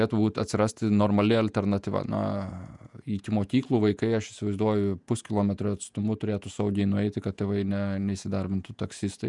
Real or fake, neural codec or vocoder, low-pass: fake; vocoder, 48 kHz, 128 mel bands, Vocos; 10.8 kHz